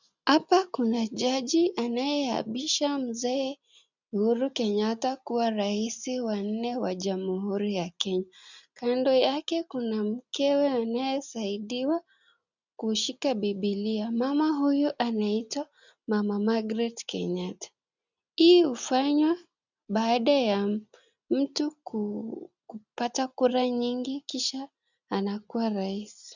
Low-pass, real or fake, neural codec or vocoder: 7.2 kHz; real; none